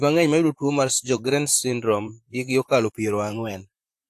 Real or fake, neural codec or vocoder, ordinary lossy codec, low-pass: fake; vocoder, 44.1 kHz, 128 mel bands, Pupu-Vocoder; AAC, 64 kbps; 14.4 kHz